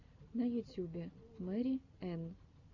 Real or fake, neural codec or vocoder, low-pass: real; none; 7.2 kHz